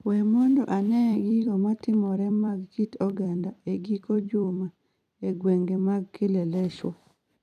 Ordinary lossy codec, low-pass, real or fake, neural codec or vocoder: none; 14.4 kHz; fake; vocoder, 44.1 kHz, 128 mel bands every 256 samples, BigVGAN v2